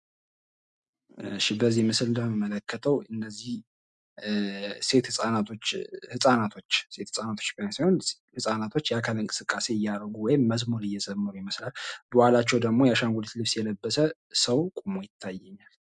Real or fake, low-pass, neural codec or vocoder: real; 10.8 kHz; none